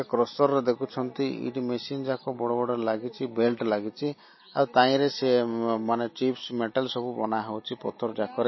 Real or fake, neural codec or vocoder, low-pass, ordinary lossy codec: real; none; 7.2 kHz; MP3, 24 kbps